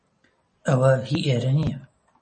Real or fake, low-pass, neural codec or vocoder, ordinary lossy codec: real; 10.8 kHz; none; MP3, 32 kbps